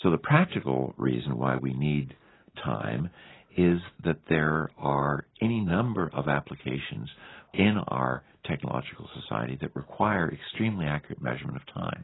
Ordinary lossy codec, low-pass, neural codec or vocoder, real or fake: AAC, 16 kbps; 7.2 kHz; none; real